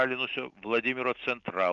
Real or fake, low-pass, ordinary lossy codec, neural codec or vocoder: real; 7.2 kHz; Opus, 32 kbps; none